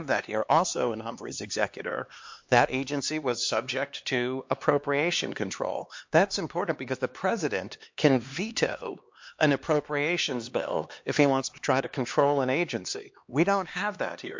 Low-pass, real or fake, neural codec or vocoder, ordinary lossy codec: 7.2 kHz; fake; codec, 16 kHz, 2 kbps, X-Codec, HuBERT features, trained on LibriSpeech; MP3, 48 kbps